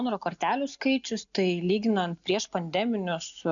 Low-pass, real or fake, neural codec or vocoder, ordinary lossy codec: 7.2 kHz; real; none; MP3, 96 kbps